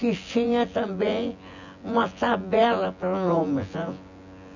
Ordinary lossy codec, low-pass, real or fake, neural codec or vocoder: none; 7.2 kHz; fake; vocoder, 24 kHz, 100 mel bands, Vocos